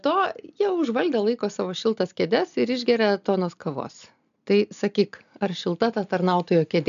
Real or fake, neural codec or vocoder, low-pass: real; none; 7.2 kHz